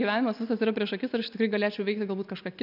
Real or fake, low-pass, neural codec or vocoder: real; 5.4 kHz; none